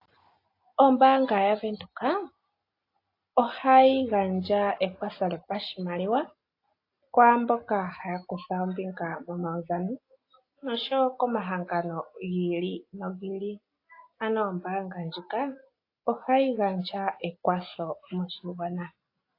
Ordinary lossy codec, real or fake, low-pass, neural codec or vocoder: AAC, 32 kbps; real; 5.4 kHz; none